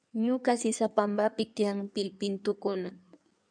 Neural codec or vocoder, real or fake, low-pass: codec, 16 kHz in and 24 kHz out, 1.1 kbps, FireRedTTS-2 codec; fake; 9.9 kHz